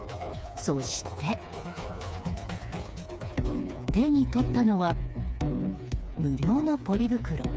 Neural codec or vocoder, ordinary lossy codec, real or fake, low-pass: codec, 16 kHz, 4 kbps, FreqCodec, smaller model; none; fake; none